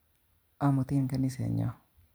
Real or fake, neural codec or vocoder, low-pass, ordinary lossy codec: fake; vocoder, 44.1 kHz, 128 mel bands every 512 samples, BigVGAN v2; none; none